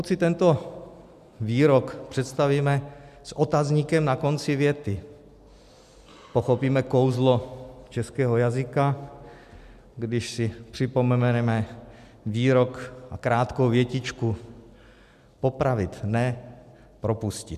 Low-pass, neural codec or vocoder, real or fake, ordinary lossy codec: 14.4 kHz; none; real; MP3, 96 kbps